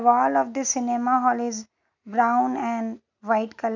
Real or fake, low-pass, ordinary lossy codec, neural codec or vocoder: real; 7.2 kHz; none; none